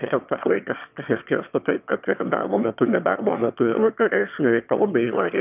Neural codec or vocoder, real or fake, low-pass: autoencoder, 22.05 kHz, a latent of 192 numbers a frame, VITS, trained on one speaker; fake; 3.6 kHz